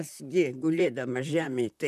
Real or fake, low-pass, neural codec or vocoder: fake; 14.4 kHz; vocoder, 44.1 kHz, 128 mel bands, Pupu-Vocoder